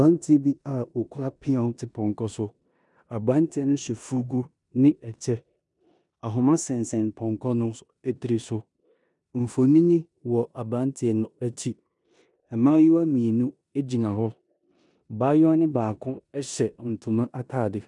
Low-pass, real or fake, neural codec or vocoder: 10.8 kHz; fake; codec, 16 kHz in and 24 kHz out, 0.9 kbps, LongCat-Audio-Codec, four codebook decoder